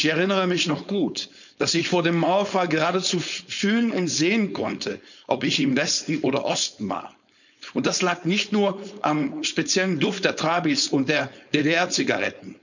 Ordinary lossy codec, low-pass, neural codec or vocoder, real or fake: none; 7.2 kHz; codec, 16 kHz, 4.8 kbps, FACodec; fake